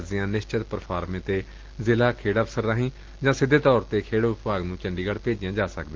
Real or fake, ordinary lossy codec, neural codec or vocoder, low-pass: real; Opus, 16 kbps; none; 7.2 kHz